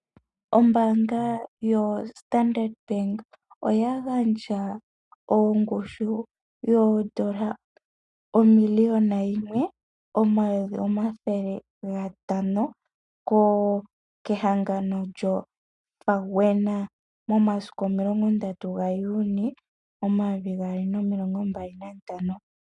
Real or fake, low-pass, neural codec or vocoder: real; 10.8 kHz; none